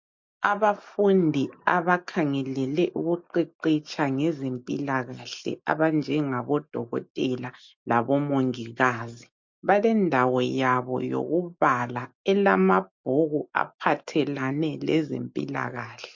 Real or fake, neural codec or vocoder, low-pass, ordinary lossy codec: real; none; 7.2 kHz; MP3, 48 kbps